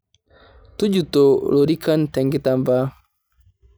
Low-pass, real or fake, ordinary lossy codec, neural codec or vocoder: none; real; none; none